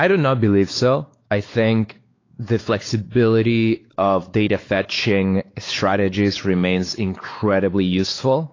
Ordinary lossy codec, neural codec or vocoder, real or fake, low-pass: AAC, 32 kbps; codec, 16 kHz, 4 kbps, X-Codec, WavLM features, trained on Multilingual LibriSpeech; fake; 7.2 kHz